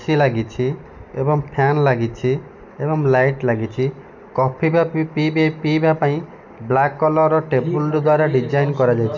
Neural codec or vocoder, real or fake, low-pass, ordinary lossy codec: none; real; 7.2 kHz; none